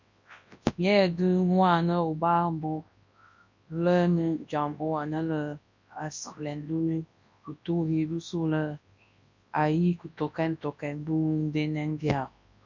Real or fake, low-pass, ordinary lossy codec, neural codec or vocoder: fake; 7.2 kHz; MP3, 64 kbps; codec, 24 kHz, 0.9 kbps, WavTokenizer, large speech release